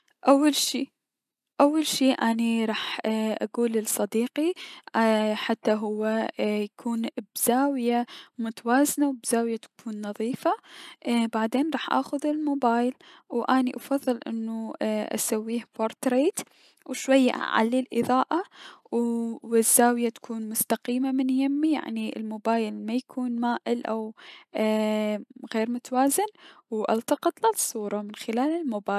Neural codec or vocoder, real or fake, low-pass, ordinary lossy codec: none; real; 14.4 kHz; none